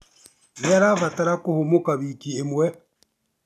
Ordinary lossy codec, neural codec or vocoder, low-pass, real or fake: none; none; 14.4 kHz; real